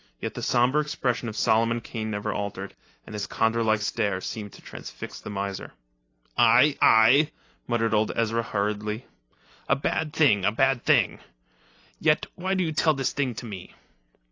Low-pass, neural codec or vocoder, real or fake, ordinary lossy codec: 7.2 kHz; none; real; AAC, 32 kbps